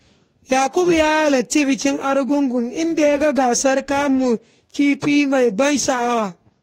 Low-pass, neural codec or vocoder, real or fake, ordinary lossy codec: 19.8 kHz; codec, 44.1 kHz, 2.6 kbps, DAC; fake; AAC, 48 kbps